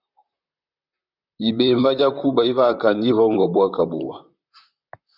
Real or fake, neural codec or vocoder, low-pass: fake; vocoder, 44.1 kHz, 128 mel bands, Pupu-Vocoder; 5.4 kHz